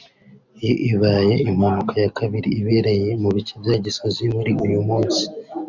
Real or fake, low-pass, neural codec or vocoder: real; 7.2 kHz; none